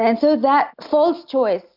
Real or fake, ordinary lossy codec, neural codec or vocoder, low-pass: real; MP3, 48 kbps; none; 5.4 kHz